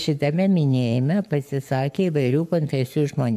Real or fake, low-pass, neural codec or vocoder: fake; 14.4 kHz; codec, 44.1 kHz, 7.8 kbps, Pupu-Codec